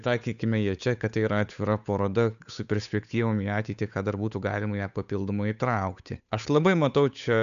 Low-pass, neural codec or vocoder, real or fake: 7.2 kHz; codec, 16 kHz, 8 kbps, FunCodec, trained on Chinese and English, 25 frames a second; fake